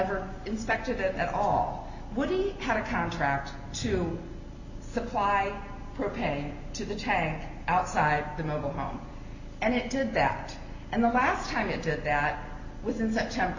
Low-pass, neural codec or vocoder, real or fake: 7.2 kHz; none; real